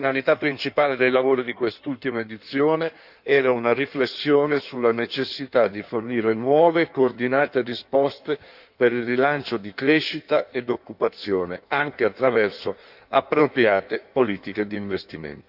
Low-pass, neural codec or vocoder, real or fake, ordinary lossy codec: 5.4 kHz; codec, 16 kHz in and 24 kHz out, 1.1 kbps, FireRedTTS-2 codec; fake; none